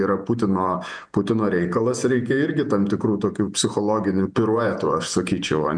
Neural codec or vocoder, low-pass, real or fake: vocoder, 48 kHz, 128 mel bands, Vocos; 9.9 kHz; fake